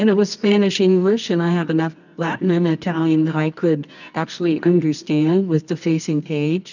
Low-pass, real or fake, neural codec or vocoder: 7.2 kHz; fake; codec, 24 kHz, 0.9 kbps, WavTokenizer, medium music audio release